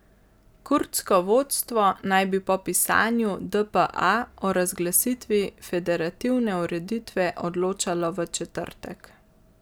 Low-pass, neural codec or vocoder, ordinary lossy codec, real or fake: none; none; none; real